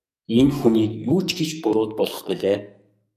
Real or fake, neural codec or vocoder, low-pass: fake; codec, 44.1 kHz, 2.6 kbps, SNAC; 14.4 kHz